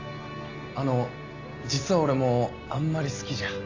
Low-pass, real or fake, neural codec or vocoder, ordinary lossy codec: 7.2 kHz; real; none; AAC, 48 kbps